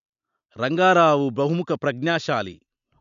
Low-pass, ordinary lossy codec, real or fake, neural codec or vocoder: 7.2 kHz; none; real; none